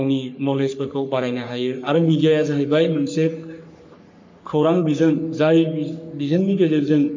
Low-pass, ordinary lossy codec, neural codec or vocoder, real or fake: 7.2 kHz; MP3, 48 kbps; codec, 44.1 kHz, 3.4 kbps, Pupu-Codec; fake